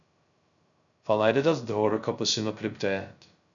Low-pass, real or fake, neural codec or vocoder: 7.2 kHz; fake; codec, 16 kHz, 0.2 kbps, FocalCodec